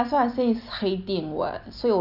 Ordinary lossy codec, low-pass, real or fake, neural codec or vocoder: none; 5.4 kHz; real; none